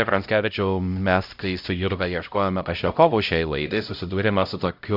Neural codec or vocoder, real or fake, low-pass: codec, 16 kHz, 0.5 kbps, X-Codec, HuBERT features, trained on LibriSpeech; fake; 5.4 kHz